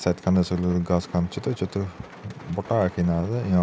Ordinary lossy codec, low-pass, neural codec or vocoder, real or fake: none; none; none; real